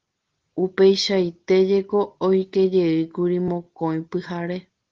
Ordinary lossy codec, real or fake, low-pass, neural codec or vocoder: Opus, 16 kbps; real; 7.2 kHz; none